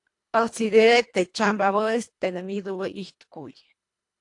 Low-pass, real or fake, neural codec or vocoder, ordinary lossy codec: 10.8 kHz; fake; codec, 24 kHz, 1.5 kbps, HILCodec; AAC, 48 kbps